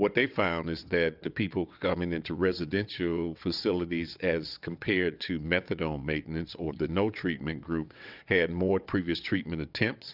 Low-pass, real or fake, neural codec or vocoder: 5.4 kHz; fake; vocoder, 22.05 kHz, 80 mel bands, WaveNeXt